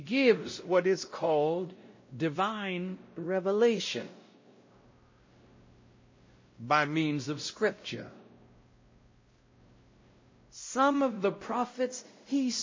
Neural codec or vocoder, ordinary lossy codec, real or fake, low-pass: codec, 16 kHz, 0.5 kbps, X-Codec, WavLM features, trained on Multilingual LibriSpeech; MP3, 32 kbps; fake; 7.2 kHz